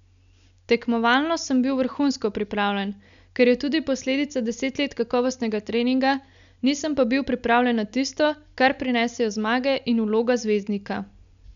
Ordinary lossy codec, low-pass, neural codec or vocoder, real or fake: none; 7.2 kHz; none; real